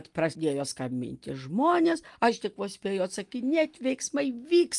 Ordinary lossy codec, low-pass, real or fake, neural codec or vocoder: Opus, 32 kbps; 10.8 kHz; real; none